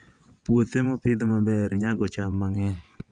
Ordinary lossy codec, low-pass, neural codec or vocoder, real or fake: none; 9.9 kHz; vocoder, 22.05 kHz, 80 mel bands, WaveNeXt; fake